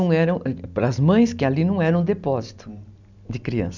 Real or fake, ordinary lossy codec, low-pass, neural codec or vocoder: real; none; 7.2 kHz; none